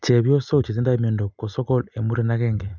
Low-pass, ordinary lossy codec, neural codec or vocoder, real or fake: 7.2 kHz; none; none; real